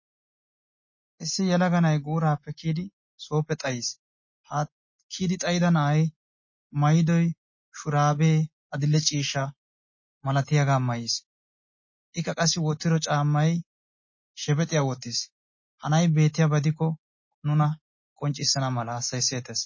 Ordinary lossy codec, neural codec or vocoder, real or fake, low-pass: MP3, 32 kbps; none; real; 7.2 kHz